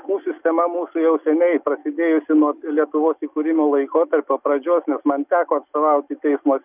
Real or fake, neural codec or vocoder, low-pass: real; none; 3.6 kHz